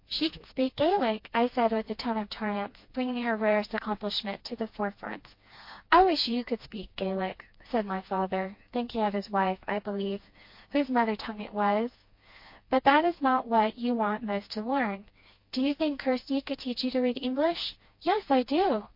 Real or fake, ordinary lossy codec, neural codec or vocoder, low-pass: fake; MP3, 32 kbps; codec, 16 kHz, 2 kbps, FreqCodec, smaller model; 5.4 kHz